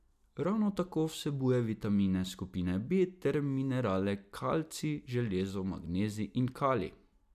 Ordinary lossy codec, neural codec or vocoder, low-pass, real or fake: none; none; 14.4 kHz; real